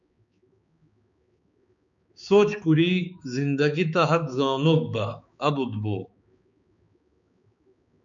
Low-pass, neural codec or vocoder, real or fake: 7.2 kHz; codec, 16 kHz, 4 kbps, X-Codec, HuBERT features, trained on balanced general audio; fake